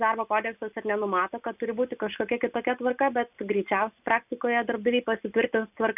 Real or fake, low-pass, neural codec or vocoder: real; 3.6 kHz; none